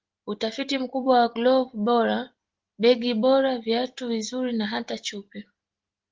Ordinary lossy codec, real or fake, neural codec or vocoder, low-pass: Opus, 16 kbps; real; none; 7.2 kHz